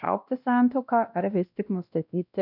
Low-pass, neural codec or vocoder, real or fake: 5.4 kHz; codec, 16 kHz, 1 kbps, X-Codec, WavLM features, trained on Multilingual LibriSpeech; fake